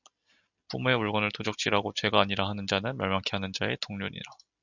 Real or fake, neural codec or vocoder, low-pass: real; none; 7.2 kHz